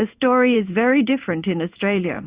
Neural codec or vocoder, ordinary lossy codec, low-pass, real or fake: codec, 16 kHz in and 24 kHz out, 1 kbps, XY-Tokenizer; Opus, 64 kbps; 3.6 kHz; fake